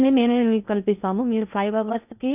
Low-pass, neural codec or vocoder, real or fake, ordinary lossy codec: 3.6 kHz; codec, 16 kHz in and 24 kHz out, 0.8 kbps, FocalCodec, streaming, 65536 codes; fake; none